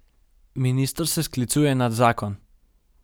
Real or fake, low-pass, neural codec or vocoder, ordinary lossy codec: real; none; none; none